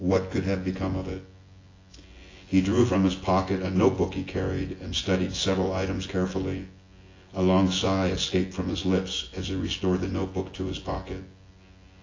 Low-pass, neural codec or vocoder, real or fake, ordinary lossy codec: 7.2 kHz; vocoder, 24 kHz, 100 mel bands, Vocos; fake; AAC, 32 kbps